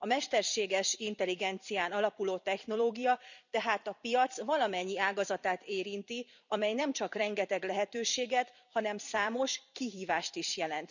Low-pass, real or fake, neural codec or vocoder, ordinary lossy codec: 7.2 kHz; fake; vocoder, 44.1 kHz, 128 mel bands every 256 samples, BigVGAN v2; none